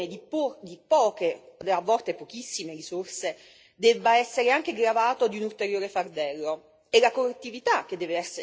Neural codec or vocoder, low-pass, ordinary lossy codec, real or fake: none; none; none; real